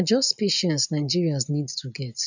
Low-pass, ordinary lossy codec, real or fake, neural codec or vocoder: 7.2 kHz; none; real; none